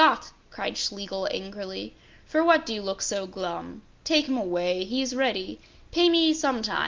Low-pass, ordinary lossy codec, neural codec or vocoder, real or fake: 7.2 kHz; Opus, 32 kbps; none; real